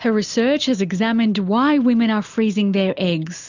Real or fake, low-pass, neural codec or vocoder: real; 7.2 kHz; none